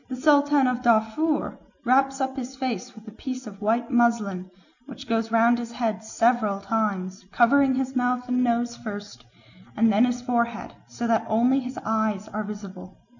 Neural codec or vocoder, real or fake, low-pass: none; real; 7.2 kHz